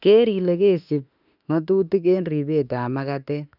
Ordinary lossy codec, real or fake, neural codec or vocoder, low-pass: none; fake; autoencoder, 48 kHz, 32 numbers a frame, DAC-VAE, trained on Japanese speech; 5.4 kHz